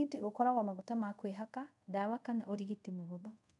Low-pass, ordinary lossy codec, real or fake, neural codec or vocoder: 10.8 kHz; none; fake; codec, 24 kHz, 0.5 kbps, DualCodec